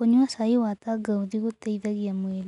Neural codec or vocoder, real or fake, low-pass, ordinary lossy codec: none; real; 10.8 kHz; none